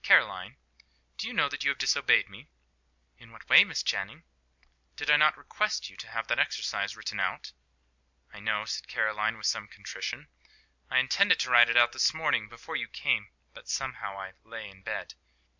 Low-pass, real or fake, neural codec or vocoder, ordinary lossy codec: 7.2 kHz; real; none; MP3, 64 kbps